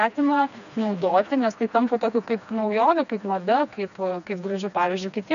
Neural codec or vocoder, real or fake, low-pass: codec, 16 kHz, 2 kbps, FreqCodec, smaller model; fake; 7.2 kHz